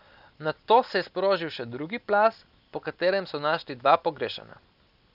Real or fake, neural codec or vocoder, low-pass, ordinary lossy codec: real; none; 5.4 kHz; none